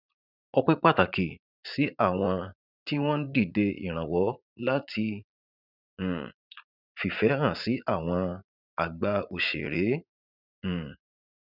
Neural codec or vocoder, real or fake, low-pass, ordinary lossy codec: vocoder, 44.1 kHz, 80 mel bands, Vocos; fake; 5.4 kHz; none